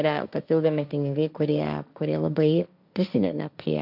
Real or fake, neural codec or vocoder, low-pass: fake; codec, 16 kHz, 1.1 kbps, Voila-Tokenizer; 5.4 kHz